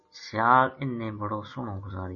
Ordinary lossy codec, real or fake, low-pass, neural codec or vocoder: MP3, 32 kbps; real; 7.2 kHz; none